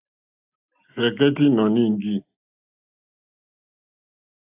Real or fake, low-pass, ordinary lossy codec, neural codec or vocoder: real; 3.6 kHz; AAC, 32 kbps; none